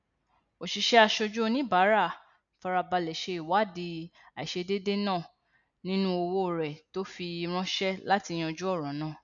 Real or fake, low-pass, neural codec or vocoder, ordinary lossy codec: real; 7.2 kHz; none; none